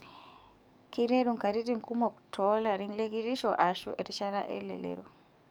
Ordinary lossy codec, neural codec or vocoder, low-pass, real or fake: none; codec, 44.1 kHz, 7.8 kbps, DAC; none; fake